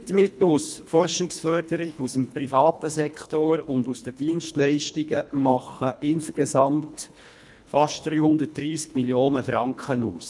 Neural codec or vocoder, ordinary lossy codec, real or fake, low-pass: codec, 24 kHz, 1.5 kbps, HILCodec; none; fake; none